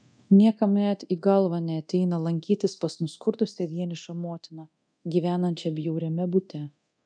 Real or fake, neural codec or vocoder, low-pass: fake; codec, 24 kHz, 0.9 kbps, DualCodec; 9.9 kHz